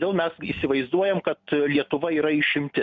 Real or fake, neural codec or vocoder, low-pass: real; none; 7.2 kHz